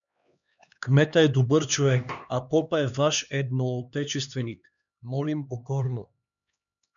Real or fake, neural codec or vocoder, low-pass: fake; codec, 16 kHz, 2 kbps, X-Codec, HuBERT features, trained on LibriSpeech; 7.2 kHz